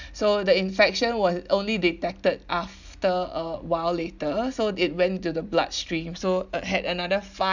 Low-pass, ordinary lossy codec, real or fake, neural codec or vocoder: 7.2 kHz; none; real; none